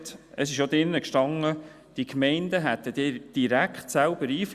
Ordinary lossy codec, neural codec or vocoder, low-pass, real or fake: Opus, 64 kbps; none; 14.4 kHz; real